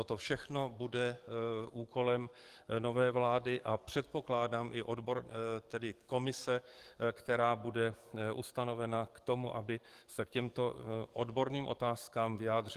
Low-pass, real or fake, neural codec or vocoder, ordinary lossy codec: 14.4 kHz; fake; codec, 44.1 kHz, 7.8 kbps, DAC; Opus, 24 kbps